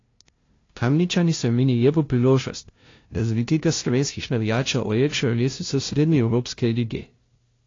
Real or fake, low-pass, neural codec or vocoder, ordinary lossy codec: fake; 7.2 kHz; codec, 16 kHz, 0.5 kbps, FunCodec, trained on LibriTTS, 25 frames a second; AAC, 32 kbps